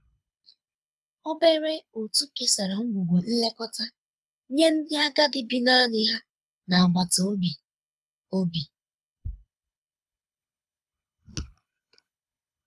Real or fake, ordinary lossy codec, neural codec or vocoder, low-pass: fake; none; codec, 24 kHz, 6 kbps, HILCodec; none